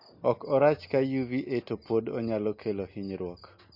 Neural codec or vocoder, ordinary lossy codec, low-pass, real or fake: none; MP3, 32 kbps; 5.4 kHz; real